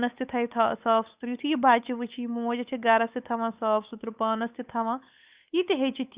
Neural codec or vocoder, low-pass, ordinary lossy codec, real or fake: codec, 16 kHz, 8 kbps, FunCodec, trained on Chinese and English, 25 frames a second; 3.6 kHz; Opus, 64 kbps; fake